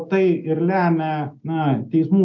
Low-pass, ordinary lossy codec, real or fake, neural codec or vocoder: 7.2 kHz; MP3, 64 kbps; real; none